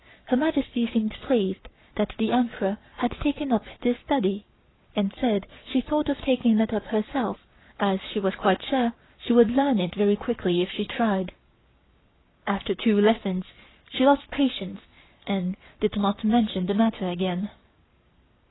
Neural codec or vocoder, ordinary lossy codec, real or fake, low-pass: codec, 44.1 kHz, 7.8 kbps, Pupu-Codec; AAC, 16 kbps; fake; 7.2 kHz